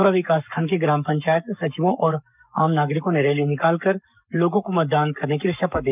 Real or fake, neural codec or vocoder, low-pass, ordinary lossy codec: fake; codec, 44.1 kHz, 7.8 kbps, Pupu-Codec; 3.6 kHz; none